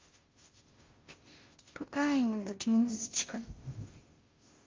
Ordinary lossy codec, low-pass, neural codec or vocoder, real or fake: Opus, 32 kbps; 7.2 kHz; codec, 16 kHz, 0.5 kbps, FunCodec, trained on Chinese and English, 25 frames a second; fake